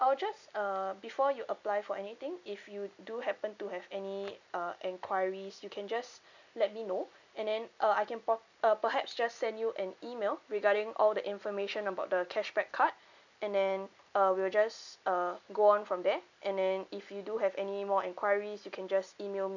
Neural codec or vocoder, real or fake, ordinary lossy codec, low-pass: none; real; none; 7.2 kHz